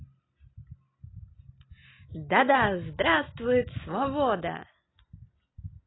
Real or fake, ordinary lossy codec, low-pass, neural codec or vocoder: real; AAC, 16 kbps; 7.2 kHz; none